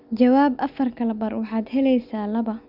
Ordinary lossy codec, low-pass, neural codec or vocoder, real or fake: none; 5.4 kHz; none; real